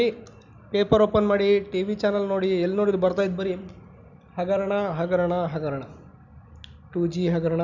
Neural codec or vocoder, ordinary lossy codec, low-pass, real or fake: none; none; 7.2 kHz; real